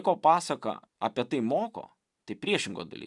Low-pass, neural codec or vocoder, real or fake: 10.8 kHz; none; real